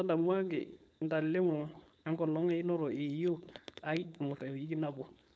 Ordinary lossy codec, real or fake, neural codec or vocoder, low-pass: none; fake; codec, 16 kHz, 4.8 kbps, FACodec; none